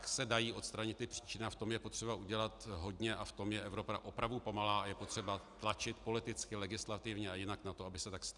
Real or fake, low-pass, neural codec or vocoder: real; 10.8 kHz; none